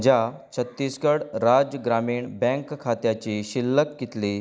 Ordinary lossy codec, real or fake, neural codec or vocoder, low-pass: none; real; none; none